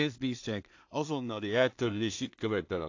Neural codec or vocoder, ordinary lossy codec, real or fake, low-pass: codec, 16 kHz in and 24 kHz out, 0.4 kbps, LongCat-Audio-Codec, two codebook decoder; AAC, 48 kbps; fake; 7.2 kHz